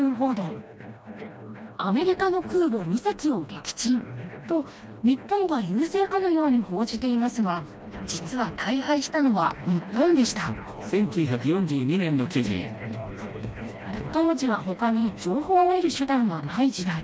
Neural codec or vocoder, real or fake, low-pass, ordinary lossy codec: codec, 16 kHz, 1 kbps, FreqCodec, smaller model; fake; none; none